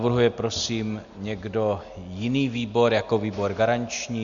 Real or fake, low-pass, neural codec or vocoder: real; 7.2 kHz; none